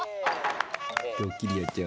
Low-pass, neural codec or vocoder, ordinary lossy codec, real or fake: none; none; none; real